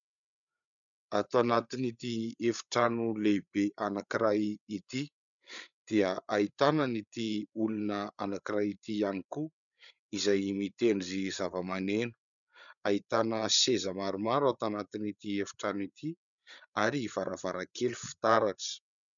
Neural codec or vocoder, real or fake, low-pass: codec, 16 kHz, 8 kbps, FreqCodec, larger model; fake; 7.2 kHz